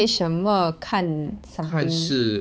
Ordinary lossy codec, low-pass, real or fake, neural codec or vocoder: none; none; fake; codec, 16 kHz, 4 kbps, X-Codec, HuBERT features, trained on balanced general audio